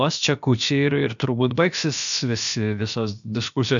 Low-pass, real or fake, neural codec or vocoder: 7.2 kHz; fake; codec, 16 kHz, about 1 kbps, DyCAST, with the encoder's durations